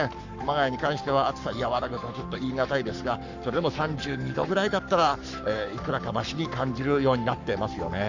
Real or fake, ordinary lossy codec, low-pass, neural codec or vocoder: fake; none; 7.2 kHz; codec, 44.1 kHz, 7.8 kbps, Pupu-Codec